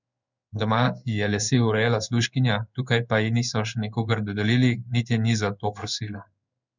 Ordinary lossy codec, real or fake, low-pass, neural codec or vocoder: none; fake; 7.2 kHz; codec, 16 kHz in and 24 kHz out, 1 kbps, XY-Tokenizer